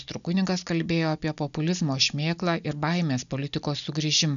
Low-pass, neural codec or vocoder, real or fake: 7.2 kHz; none; real